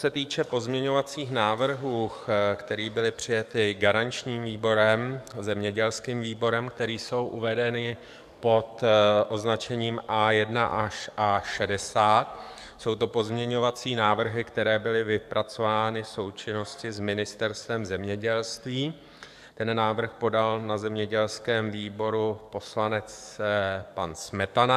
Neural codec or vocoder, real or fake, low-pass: codec, 44.1 kHz, 7.8 kbps, DAC; fake; 14.4 kHz